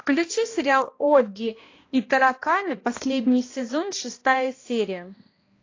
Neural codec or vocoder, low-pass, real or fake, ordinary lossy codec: codec, 16 kHz, 1 kbps, X-Codec, HuBERT features, trained on balanced general audio; 7.2 kHz; fake; AAC, 32 kbps